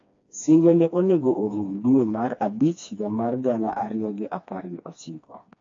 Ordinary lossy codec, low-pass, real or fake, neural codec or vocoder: AAC, 48 kbps; 7.2 kHz; fake; codec, 16 kHz, 2 kbps, FreqCodec, smaller model